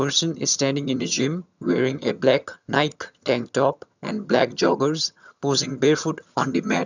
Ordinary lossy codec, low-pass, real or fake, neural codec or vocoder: none; 7.2 kHz; fake; vocoder, 22.05 kHz, 80 mel bands, HiFi-GAN